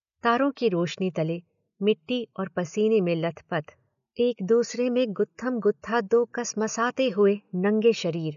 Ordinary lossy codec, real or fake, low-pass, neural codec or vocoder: none; real; 7.2 kHz; none